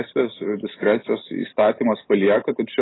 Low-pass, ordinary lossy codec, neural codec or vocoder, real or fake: 7.2 kHz; AAC, 16 kbps; none; real